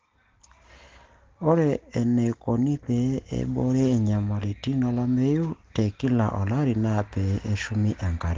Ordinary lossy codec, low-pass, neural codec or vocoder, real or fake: Opus, 16 kbps; 7.2 kHz; none; real